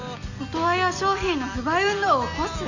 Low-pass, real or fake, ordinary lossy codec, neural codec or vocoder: 7.2 kHz; real; none; none